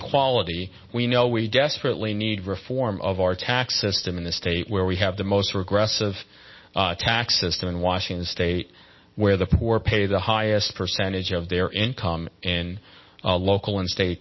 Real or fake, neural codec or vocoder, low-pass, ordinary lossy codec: real; none; 7.2 kHz; MP3, 24 kbps